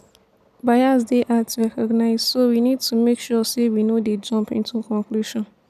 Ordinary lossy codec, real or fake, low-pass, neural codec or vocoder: none; real; 14.4 kHz; none